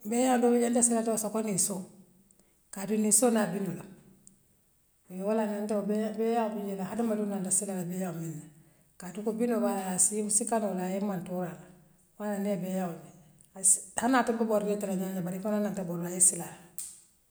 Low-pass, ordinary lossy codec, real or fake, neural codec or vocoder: none; none; real; none